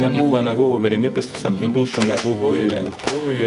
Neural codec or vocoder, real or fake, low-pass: codec, 24 kHz, 0.9 kbps, WavTokenizer, medium music audio release; fake; 10.8 kHz